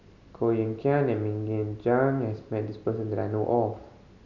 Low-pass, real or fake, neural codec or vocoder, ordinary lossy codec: 7.2 kHz; real; none; none